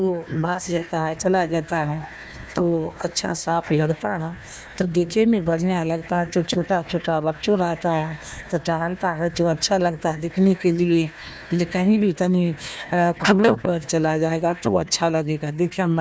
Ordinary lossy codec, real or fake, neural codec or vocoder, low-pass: none; fake; codec, 16 kHz, 1 kbps, FunCodec, trained on Chinese and English, 50 frames a second; none